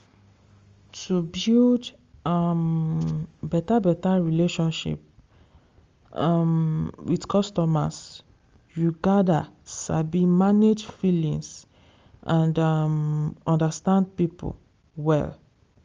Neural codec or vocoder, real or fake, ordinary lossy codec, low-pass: none; real; Opus, 32 kbps; 7.2 kHz